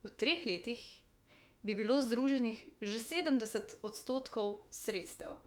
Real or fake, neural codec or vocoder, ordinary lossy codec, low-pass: fake; autoencoder, 48 kHz, 32 numbers a frame, DAC-VAE, trained on Japanese speech; Opus, 64 kbps; 19.8 kHz